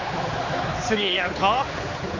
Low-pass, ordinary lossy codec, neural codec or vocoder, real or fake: 7.2 kHz; AAC, 48 kbps; codec, 16 kHz, 4 kbps, X-Codec, HuBERT features, trained on general audio; fake